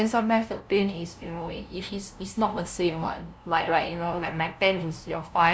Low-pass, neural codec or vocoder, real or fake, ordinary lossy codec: none; codec, 16 kHz, 0.5 kbps, FunCodec, trained on LibriTTS, 25 frames a second; fake; none